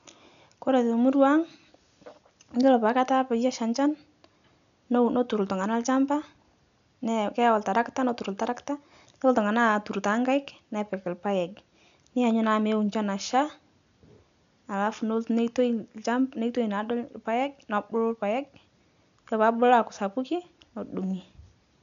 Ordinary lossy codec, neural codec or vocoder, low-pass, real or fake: none; none; 7.2 kHz; real